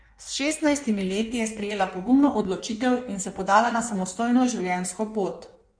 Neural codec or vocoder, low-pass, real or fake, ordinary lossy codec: codec, 16 kHz in and 24 kHz out, 1.1 kbps, FireRedTTS-2 codec; 9.9 kHz; fake; none